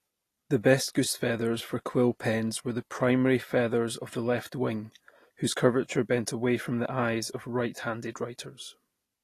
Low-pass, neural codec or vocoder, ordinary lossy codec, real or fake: 14.4 kHz; none; AAC, 48 kbps; real